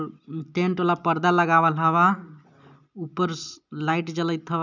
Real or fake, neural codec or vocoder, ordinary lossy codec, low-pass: real; none; none; 7.2 kHz